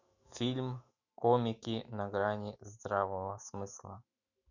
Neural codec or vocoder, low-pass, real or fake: autoencoder, 48 kHz, 128 numbers a frame, DAC-VAE, trained on Japanese speech; 7.2 kHz; fake